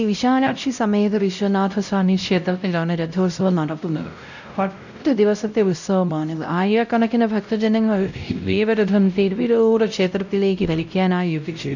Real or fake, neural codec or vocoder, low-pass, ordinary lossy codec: fake; codec, 16 kHz, 0.5 kbps, X-Codec, WavLM features, trained on Multilingual LibriSpeech; 7.2 kHz; none